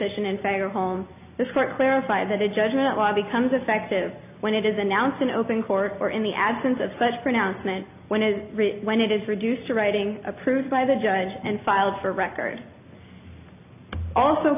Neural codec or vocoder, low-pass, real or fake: none; 3.6 kHz; real